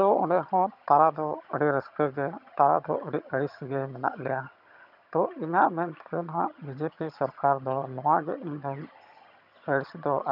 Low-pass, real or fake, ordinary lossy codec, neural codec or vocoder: 5.4 kHz; fake; none; vocoder, 22.05 kHz, 80 mel bands, HiFi-GAN